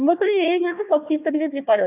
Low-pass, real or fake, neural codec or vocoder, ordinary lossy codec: 3.6 kHz; fake; codec, 16 kHz, 1 kbps, FunCodec, trained on Chinese and English, 50 frames a second; none